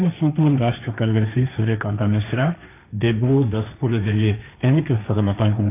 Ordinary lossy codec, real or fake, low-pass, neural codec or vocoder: none; fake; 3.6 kHz; codec, 16 kHz, 1.1 kbps, Voila-Tokenizer